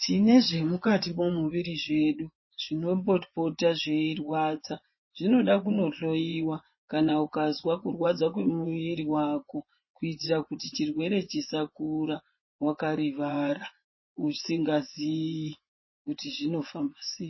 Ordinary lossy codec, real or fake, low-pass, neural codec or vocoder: MP3, 24 kbps; fake; 7.2 kHz; vocoder, 24 kHz, 100 mel bands, Vocos